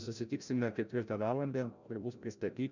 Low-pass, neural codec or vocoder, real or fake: 7.2 kHz; codec, 16 kHz, 0.5 kbps, FreqCodec, larger model; fake